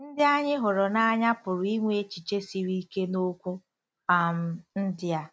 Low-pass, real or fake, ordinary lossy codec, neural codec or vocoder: none; real; none; none